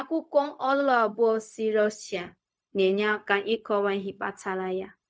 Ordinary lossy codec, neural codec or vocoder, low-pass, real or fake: none; codec, 16 kHz, 0.4 kbps, LongCat-Audio-Codec; none; fake